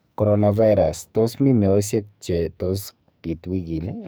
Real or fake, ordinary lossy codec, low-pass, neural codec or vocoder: fake; none; none; codec, 44.1 kHz, 2.6 kbps, SNAC